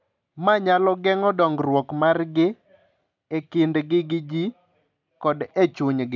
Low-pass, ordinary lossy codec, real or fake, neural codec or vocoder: 7.2 kHz; none; real; none